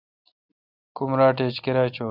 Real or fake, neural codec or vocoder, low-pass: real; none; 5.4 kHz